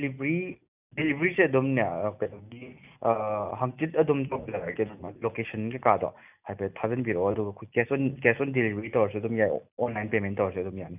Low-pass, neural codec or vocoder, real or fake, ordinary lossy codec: 3.6 kHz; none; real; none